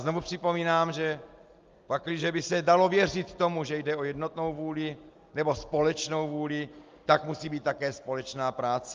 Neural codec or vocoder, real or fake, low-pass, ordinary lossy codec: none; real; 7.2 kHz; Opus, 32 kbps